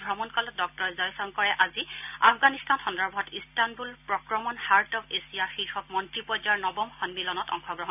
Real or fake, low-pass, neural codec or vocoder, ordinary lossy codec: real; 3.6 kHz; none; none